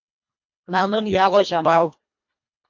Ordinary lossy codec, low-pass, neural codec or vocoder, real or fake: MP3, 48 kbps; 7.2 kHz; codec, 24 kHz, 1.5 kbps, HILCodec; fake